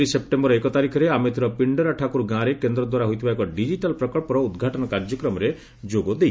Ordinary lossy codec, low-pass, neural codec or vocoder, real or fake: none; none; none; real